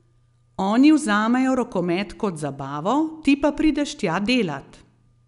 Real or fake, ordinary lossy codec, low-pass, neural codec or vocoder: real; none; 10.8 kHz; none